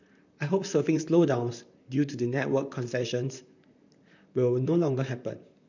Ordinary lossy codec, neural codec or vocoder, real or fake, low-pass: none; vocoder, 44.1 kHz, 128 mel bands, Pupu-Vocoder; fake; 7.2 kHz